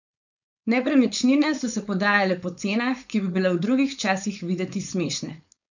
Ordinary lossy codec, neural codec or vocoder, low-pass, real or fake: none; codec, 16 kHz, 4.8 kbps, FACodec; 7.2 kHz; fake